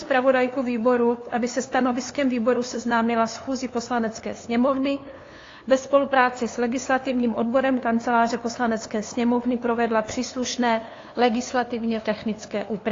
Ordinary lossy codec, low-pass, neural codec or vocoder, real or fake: AAC, 32 kbps; 7.2 kHz; codec, 16 kHz, 2 kbps, FunCodec, trained on LibriTTS, 25 frames a second; fake